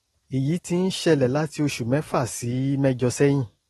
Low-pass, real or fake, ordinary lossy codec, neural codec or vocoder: 19.8 kHz; real; AAC, 32 kbps; none